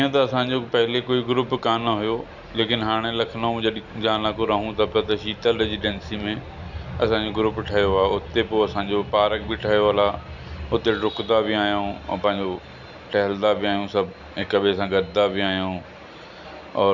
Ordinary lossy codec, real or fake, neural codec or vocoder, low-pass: none; real; none; 7.2 kHz